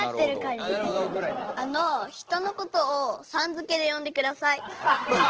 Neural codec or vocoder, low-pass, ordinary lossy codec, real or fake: none; 7.2 kHz; Opus, 16 kbps; real